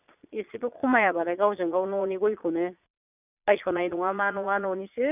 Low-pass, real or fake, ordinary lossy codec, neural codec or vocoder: 3.6 kHz; fake; none; vocoder, 22.05 kHz, 80 mel bands, Vocos